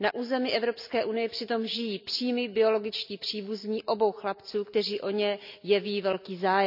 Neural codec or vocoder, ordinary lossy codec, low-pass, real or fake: none; none; 5.4 kHz; real